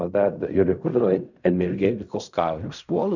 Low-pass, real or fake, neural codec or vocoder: 7.2 kHz; fake; codec, 16 kHz in and 24 kHz out, 0.4 kbps, LongCat-Audio-Codec, fine tuned four codebook decoder